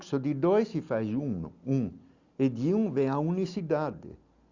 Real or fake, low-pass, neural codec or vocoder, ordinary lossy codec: real; 7.2 kHz; none; Opus, 64 kbps